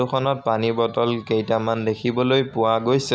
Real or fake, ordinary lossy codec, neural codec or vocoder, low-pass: real; none; none; none